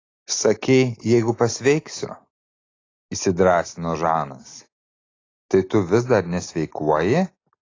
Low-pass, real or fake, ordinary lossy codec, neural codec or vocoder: 7.2 kHz; real; AAC, 32 kbps; none